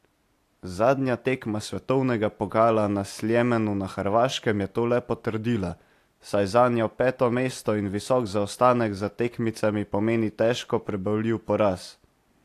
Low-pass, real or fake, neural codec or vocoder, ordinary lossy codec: 14.4 kHz; real; none; AAC, 64 kbps